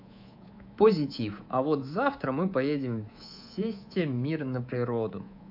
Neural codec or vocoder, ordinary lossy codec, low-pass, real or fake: codec, 24 kHz, 3.1 kbps, DualCodec; AAC, 48 kbps; 5.4 kHz; fake